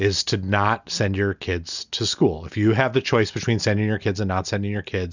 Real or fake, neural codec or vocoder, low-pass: real; none; 7.2 kHz